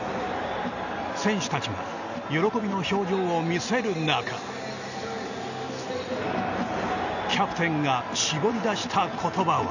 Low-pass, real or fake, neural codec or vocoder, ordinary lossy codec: 7.2 kHz; real; none; none